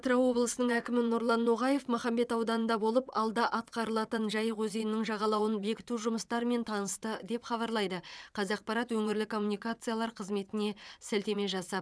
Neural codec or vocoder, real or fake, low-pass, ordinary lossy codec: vocoder, 22.05 kHz, 80 mel bands, WaveNeXt; fake; none; none